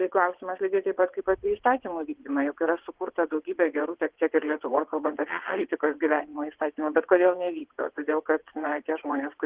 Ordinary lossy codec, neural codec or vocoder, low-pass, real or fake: Opus, 16 kbps; vocoder, 22.05 kHz, 80 mel bands, WaveNeXt; 3.6 kHz; fake